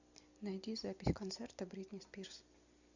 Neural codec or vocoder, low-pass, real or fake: none; 7.2 kHz; real